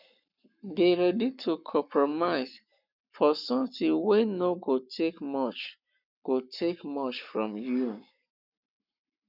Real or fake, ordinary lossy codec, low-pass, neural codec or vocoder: fake; none; 5.4 kHz; codec, 44.1 kHz, 7.8 kbps, Pupu-Codec